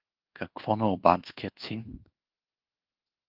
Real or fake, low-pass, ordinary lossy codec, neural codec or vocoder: fake; 5.4 kHz; Opus, 16 kbps; codec, 24 kHz, 1.2 kbps, DualCodec